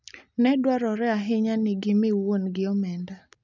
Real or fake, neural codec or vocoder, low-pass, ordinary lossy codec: real; none; 7.2 kHz; none